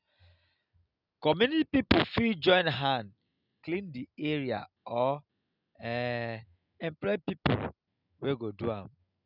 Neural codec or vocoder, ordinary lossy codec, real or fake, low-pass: none; none; real; 5.4 kHz